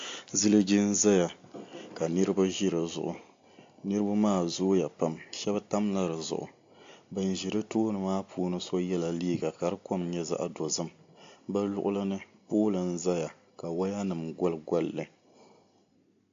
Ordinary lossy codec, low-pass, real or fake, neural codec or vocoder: AAC, 48 kbps; 7.2 kHz; real; none